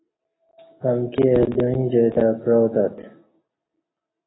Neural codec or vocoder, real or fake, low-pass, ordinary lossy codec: none; real; 7.2 kHz; AAC, 16 kbps